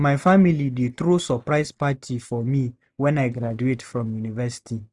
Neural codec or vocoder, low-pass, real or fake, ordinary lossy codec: none; none; real; none